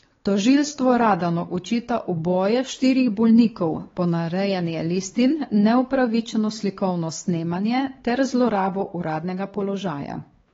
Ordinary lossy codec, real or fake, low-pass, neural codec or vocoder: AAC, 24 kbps; fake; 7.2 kHz; codec, 16 kHz, 4 kbps, X-Codec, WavLM features, trained on Multilingual LibriSpeech